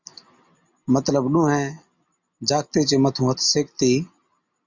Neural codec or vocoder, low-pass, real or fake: none; 7.2 kHz; real